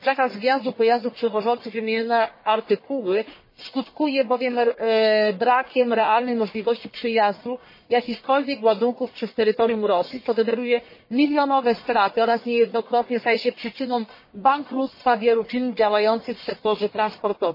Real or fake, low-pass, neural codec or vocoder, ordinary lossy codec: fake; 5.4 kHz; codec, 44.1 kHz, 1.7 kbps, Pupu-Codec; MP3, 24 kbps